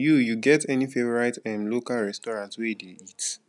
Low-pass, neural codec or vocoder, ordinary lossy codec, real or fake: 10.8 kHz; none; none; real